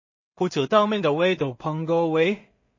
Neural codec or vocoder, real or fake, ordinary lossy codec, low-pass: codec, 16 kHz in and 24 kHz out, 0.4 kbps, LongCat-Audio-Codec, two codebook decoder; fake; MP3, 32 kbps; 7.2 kHz